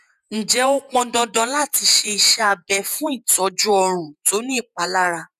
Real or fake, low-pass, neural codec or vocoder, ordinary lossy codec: fake; 14.4 kHz; vocoder, 48 kHz, 128 mel bands, Vocos; none